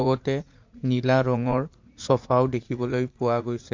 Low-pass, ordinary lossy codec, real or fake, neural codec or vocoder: 7.2 kHz; MP3, 48 kbps; fake; vocoder, 44.1 kHz, 80 mel bands, Vocos